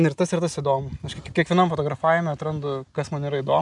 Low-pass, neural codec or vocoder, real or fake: 10.8 kHz; none; real